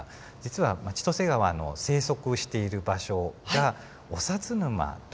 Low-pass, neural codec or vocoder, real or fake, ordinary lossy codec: none; none; real; none